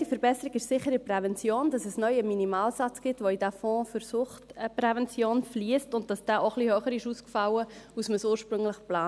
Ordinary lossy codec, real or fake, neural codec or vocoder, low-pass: none; real; none; none